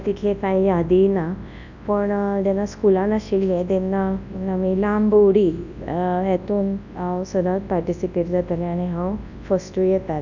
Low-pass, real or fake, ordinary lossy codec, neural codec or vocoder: 7.2 kHz; fake; none; codec, 24 kHz, 0.9 kbps, WavTokenizer, large speech release